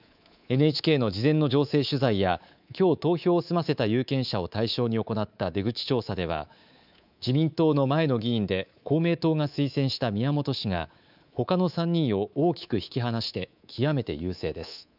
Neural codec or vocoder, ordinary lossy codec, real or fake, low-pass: codec, 24 kHz, 3.1 kbps, DualCodec; none; fake; 5.4 kHz